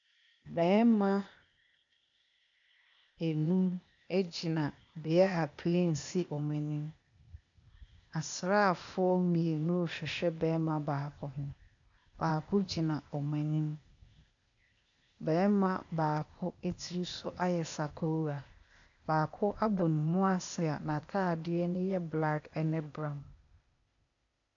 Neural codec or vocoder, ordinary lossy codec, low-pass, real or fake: codec, 16 kHz, 0.8 kbps, ZipCodec; MP3, 96 kbps; 7.2 kHz; fake